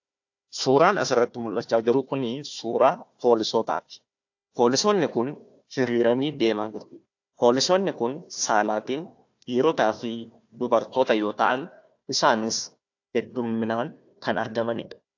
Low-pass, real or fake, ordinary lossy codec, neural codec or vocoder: 7.2 kHz; fake; AAC, 48 kbps; codec, 16 kHz, 1 kbps, FunCodec, trained on Chinese and English, 50 frames a second